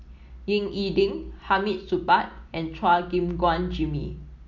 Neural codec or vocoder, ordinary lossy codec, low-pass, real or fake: none; none; 7.2 kHz; real